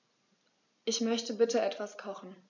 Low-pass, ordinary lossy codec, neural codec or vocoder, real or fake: 7.2 kHz; MP3, 64 kbps; none; real